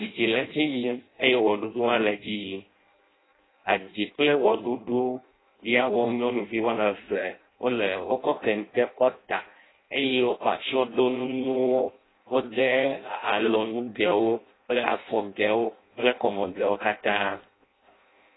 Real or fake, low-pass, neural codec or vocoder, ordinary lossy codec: fake; 7.2 kHz; codec, 16 kHz in and 24 kHz out, 0.6 kbps, FireRedTTS-2 codec; AAC, 16 kbps